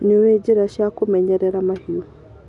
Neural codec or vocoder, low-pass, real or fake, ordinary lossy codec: none; 10.8 kHz; real; none